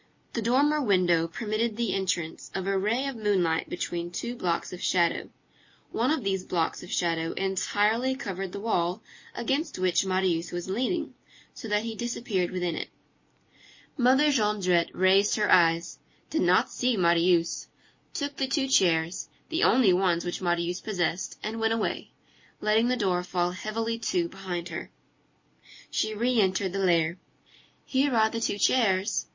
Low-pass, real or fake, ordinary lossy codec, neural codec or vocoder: 7.2 kHz; real; MP3, 32 kbps; none